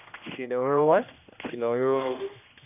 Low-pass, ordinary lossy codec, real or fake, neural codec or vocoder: 3.6 kHz; none; fake; codec, 16 kHz, 1 kbps, X-Codec, HuBERT features, trained on general audio